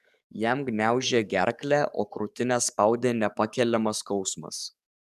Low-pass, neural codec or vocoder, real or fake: 14.4 kHz; codec, 44.1 kHz, 7.8 kbps, DAC; fake